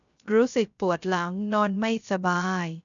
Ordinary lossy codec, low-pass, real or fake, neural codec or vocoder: none; 7.2 kHz; fake; codec, 16 kHz, 0.3 kbps, FocalCodec